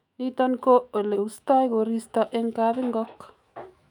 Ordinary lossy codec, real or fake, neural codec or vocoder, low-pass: none; fake; autoencoder, 48 kHz, 128 numbers a frame, DAC-VAE, trained on Japanese speech; 19.8 kHz